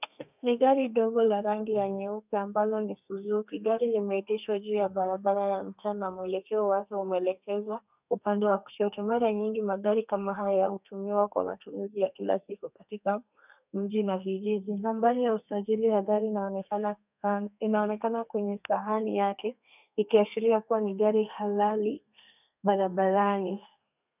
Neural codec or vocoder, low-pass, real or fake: codec, 32 kHz, 1.9 kbps, SNAC; 3.6 kHz; fake